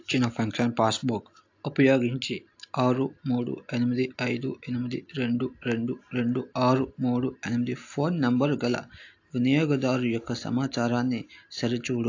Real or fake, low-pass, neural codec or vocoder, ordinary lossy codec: real; 7.2 kHz; none; AAC, 48 kbps